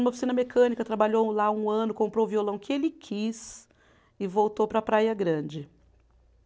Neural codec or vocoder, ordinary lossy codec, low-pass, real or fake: none; none; none; real